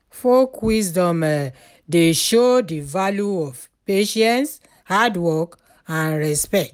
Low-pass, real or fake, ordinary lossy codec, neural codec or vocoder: 19.8 kHz; real; none; none